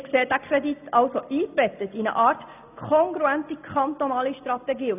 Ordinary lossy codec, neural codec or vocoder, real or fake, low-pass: none; none; real; 3.6 kHz